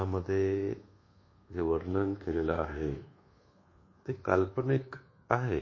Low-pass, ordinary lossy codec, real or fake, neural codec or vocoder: 7.2 kHz; MP3, 32 kbps; fake; codec, 24 kHz, 1.2 kbps, DualCodec